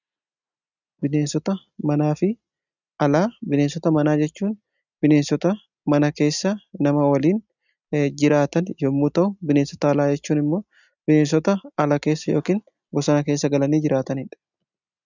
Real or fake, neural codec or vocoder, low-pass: real; none; 7.2 kHz